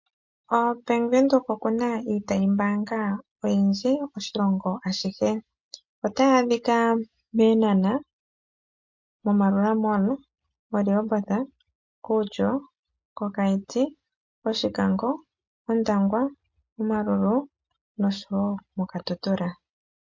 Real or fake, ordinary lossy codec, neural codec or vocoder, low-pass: real; MP3, 48 kbps; none; 7.2 kHz